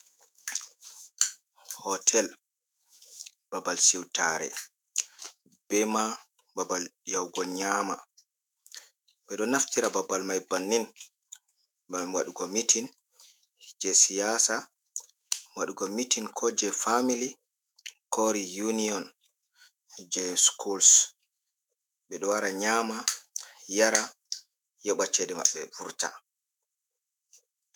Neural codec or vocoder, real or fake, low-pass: autoencoder, 48 kHz, 128 numbers a frame, DAC-VAE, trained on Japanese speech; fake; 19.8 kHz